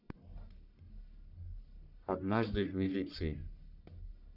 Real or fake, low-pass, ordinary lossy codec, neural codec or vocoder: fake; 5.4 kHz; none; codec, 44.1 kHz, 1.7 kbps, Pupu-Codec